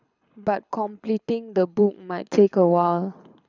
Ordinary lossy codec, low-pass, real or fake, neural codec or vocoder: none; 7.2 kHz; fake; codec, 24 kHz, 6 kbps, HILCodec